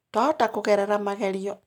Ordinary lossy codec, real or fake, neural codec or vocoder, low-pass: none; real; none; 19.8 kHz